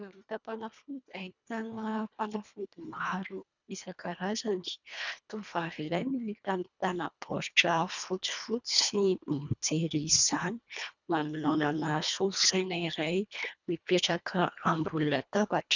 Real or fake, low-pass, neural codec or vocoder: fake; 7.2 kHz; codec, 24 kHz, 1.5 kbps, HILCodec